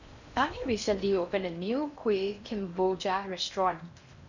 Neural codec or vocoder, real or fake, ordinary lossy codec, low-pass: codec, 16 kHz in and 24 kHz out, 0.6 kbps, FocalCodec, streaming, 4096 codes; fake; none; 7.2 kHz